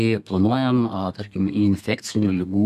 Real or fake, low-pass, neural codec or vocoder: fake; 14.4 kHz; codec, 32 kHz, 1.9 kbps, SNAC